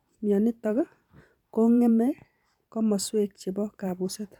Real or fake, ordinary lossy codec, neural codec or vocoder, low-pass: fake; none; vocoder, 44.1 kHz, 128 mel bands every 512 samples, BigVGAN v2; 19.8 kHz